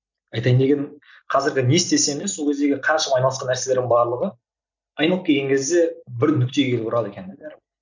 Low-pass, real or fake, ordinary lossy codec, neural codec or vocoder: 7.2 kHz; real; none; none